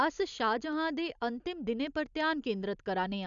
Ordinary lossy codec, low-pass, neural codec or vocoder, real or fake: none; 7.2 kHz; none; real